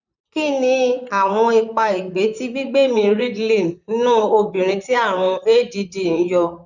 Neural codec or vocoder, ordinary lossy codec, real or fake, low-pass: vocoder, 44.1 kHz, 128 mel bands, Pupu-Vocoder; none; fake; 7.2 kHz